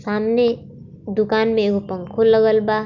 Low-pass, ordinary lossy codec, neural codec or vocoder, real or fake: 7.2 kHz; none; none; real